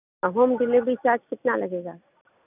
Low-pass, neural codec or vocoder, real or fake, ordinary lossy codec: 3.6 kHz; none; real; none